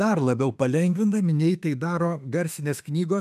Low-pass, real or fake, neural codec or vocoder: 14.4 kHz; fake; autoencoder, 48 kHz, 32 numbers a frame, DAC-VAE, trained on Japanese speech